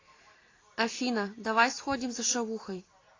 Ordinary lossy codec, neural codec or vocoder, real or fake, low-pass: AAC, 32 kbps; none; real; 7.2 kHz